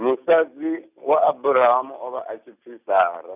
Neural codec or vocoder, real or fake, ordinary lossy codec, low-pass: none; real; none; 3.6 kHz